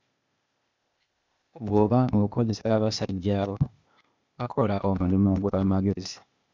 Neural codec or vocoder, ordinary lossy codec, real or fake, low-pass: codec, 16 kHz, 0.8 kbps, ZipCodec; MP3, 64 kbps; fake; 7.2 kHz